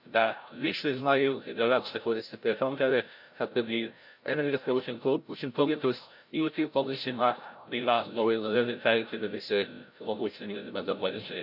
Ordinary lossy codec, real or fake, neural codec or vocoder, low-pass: none; fake; codec, 16 kHz, 0.5 kbps, FreqCodec, larger model; 5.4 kHz